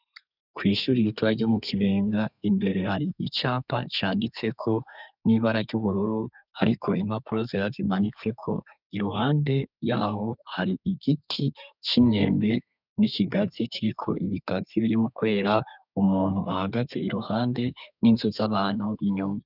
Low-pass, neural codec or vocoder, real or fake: 5.4 kHz; codec, 32 kHz, 1.9 kbps, SNAC; fake